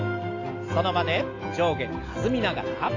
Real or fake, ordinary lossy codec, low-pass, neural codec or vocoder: real; AAC, 32 kbps; 7.2 kHz; none